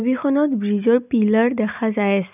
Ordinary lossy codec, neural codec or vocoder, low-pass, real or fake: none; none; 3.6 kHz; real